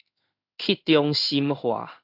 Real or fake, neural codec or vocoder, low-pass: fake; codec, 16 kHz in and 24 kHz out, 1 kbps, XY-Tokenizer; 5.4 kHz